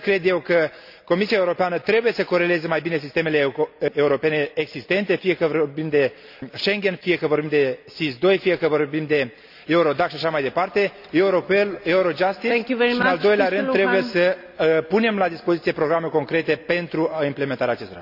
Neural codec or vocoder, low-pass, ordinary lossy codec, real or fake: none; 5.4 kHz; none; real